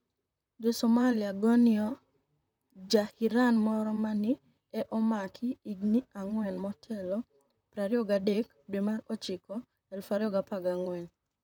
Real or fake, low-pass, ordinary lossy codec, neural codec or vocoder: fake; 19.8 kHz; none; vocoder, 44.1 kHz, 128 mel bands, Pupu-Vocoder